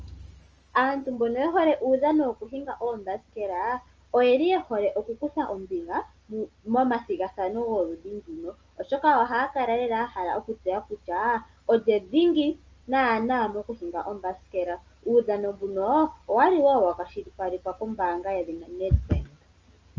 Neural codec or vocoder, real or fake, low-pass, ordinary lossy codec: none; real; 7.2 kHz; Opus, 24 kbps